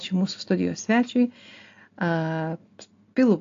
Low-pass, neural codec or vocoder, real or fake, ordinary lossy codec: 7.2 kHz; none; real; AAC, 48 kbps